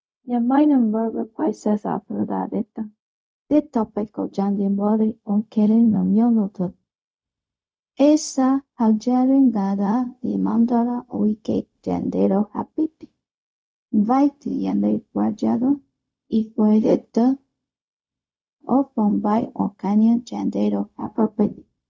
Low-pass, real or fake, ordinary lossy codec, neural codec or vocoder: none; fake; none; codec, 16 kHz, 0.4 kbps, LongCat-Audio-Codec